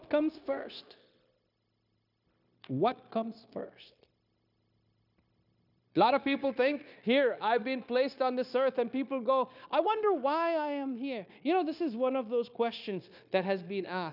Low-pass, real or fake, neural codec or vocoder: 5.4 kHz; fake; codec, 16 kHz, 0.9 kbps, LongCat-Audio-Codec